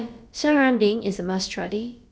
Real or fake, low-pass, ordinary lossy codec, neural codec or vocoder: fake; none; none; codec, 16 kHz, about 1 kbps, DyCAST, with the encoder's durations